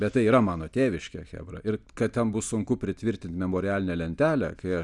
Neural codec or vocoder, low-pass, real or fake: none; 10.8 kHz; real